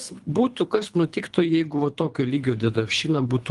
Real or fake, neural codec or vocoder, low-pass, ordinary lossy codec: fake; codec, 24 kHz, 3 kbps, HILCodec; 10.8 kHz; Opus, 24 kbps